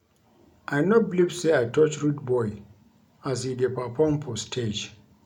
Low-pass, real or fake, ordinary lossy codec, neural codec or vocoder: 19.8 kHz; real; none; none